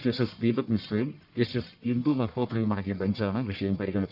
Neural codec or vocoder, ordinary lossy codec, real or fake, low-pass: codec, 44.1 kHz, 1.7 kbps, Pupu-Codec; none; fake; 5.4 kHz